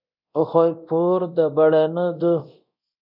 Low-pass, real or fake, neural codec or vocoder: 5.4 kHz; fake; codec, 24 kHz, 0.9 kbps, DualCodec